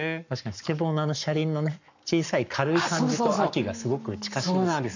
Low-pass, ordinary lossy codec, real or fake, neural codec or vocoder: 7.2 kHz; none; fake; codec, 44.1 kHz, 7.8 kbps, Pupu-Codec